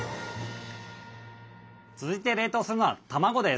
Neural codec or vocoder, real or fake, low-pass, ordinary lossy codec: none; real; none; none